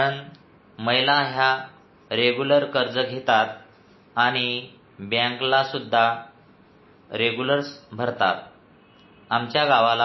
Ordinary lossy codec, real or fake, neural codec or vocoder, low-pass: MP3, 24 kbps; real; none; 7.2 kHz